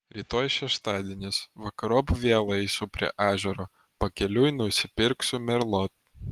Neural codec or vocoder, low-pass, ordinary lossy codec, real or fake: none; 14.4 kHz; Opus, 24 kbps; real